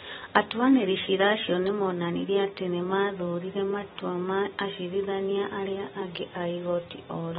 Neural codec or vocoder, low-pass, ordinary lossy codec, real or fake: vocoder, 44.1 kHz, 128 mel bands every 256 samples, BigVGAN v2; 19.8 kHz; AAC, 16 kbps; fake